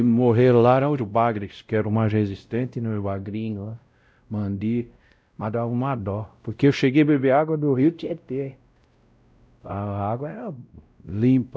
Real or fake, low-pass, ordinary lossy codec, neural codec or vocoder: fake; none; none; codec, 16 kHz, 0.5 kbps, X-Codec, WavLM features, trained on Multilingual LibriSpeech